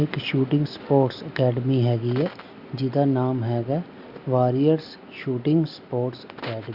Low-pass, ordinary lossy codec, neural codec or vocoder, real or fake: 5.4 kHz; Opus, 64 kbps; none; real